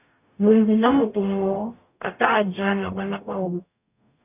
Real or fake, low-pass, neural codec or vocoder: fake; 3.6 kHz; codec, 44.1 kHz, 0.9 kbps, DAC